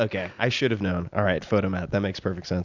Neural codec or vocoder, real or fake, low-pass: none; real; 7.2 kHz